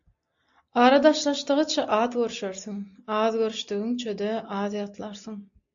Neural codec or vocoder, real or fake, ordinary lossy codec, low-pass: none; real; AAC, 64 kbps; 7.2 kHz